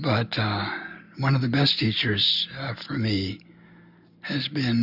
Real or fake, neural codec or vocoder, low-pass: real; none; 5.4 kHz